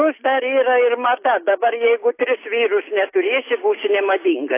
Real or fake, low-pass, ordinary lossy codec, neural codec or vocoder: real; 3.6 kHz; AAC, 24 kbps; none